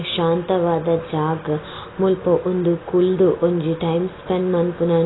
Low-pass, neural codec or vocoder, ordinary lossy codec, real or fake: 7.2 kHz; none; AAC, 16 kbps; real